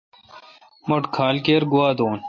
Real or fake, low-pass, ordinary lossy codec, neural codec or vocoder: real; 7.2 kHz; MP3, 32 kbps; none